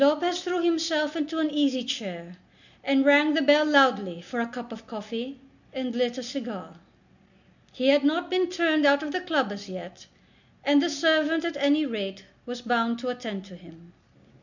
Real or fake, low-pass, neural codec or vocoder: real; 7.2 kHz; none